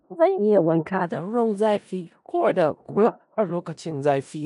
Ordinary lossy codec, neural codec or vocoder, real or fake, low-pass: none; codec, 16 kHz in and 24 kHz out, 0.4 kbps, LongCat-Audio-Codec, four codebook decoder; fake; 10.8 kHz